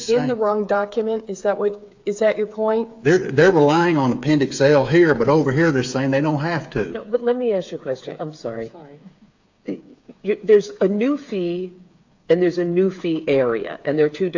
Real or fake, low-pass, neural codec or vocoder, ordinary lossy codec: fake; 7.2 kHz; codec, 16 kHz, 8 kbps, FreqCodec, smaller model; AAC, 48 kbps